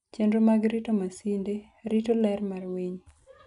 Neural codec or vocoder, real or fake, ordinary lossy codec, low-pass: none; real; none; 10.8 kHz